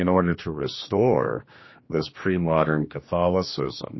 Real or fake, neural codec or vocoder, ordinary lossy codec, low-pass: fake; codec, 16 kHz, 2 kbps, X-Codec, HuBERT features, trained on general audio; MP3, 24 kbps; 7.2 kHz